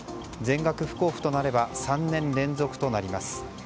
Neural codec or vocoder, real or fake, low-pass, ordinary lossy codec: none; real; none; none